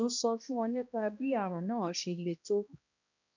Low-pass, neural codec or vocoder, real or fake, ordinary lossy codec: 7.2 kHz; codec, 16 kHz, 1 kbps, X-Codec, HuBERT features, trained on balanced general audio; fake; none